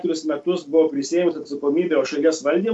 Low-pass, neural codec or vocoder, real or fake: 10.8 kHz; none; real